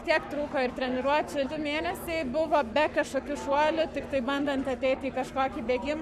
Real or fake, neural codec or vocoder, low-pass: fake; codec, 44.1 kHz, 7.8 kbps, Pupu-Codec; 14.4 kHz